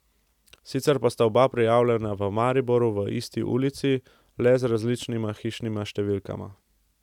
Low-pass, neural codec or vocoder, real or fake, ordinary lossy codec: 19.8 kHz; none; real; none